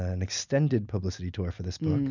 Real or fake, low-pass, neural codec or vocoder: real; 7.2 kHz; none